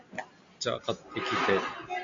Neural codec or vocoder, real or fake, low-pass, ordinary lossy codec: none; real; 7.2 kHz; MP3, 48 kbps